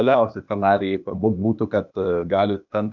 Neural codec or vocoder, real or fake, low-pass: codec, 16 kHz, 0.8 kbps, ZipCodec; fake; 7.2 kHz